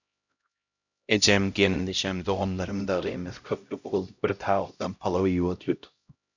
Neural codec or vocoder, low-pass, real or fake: codec, 16 kHz, 0.5 kbps, X-Codec, HuBERT features, trained on LibriSpeech; 7.2 kHz; fake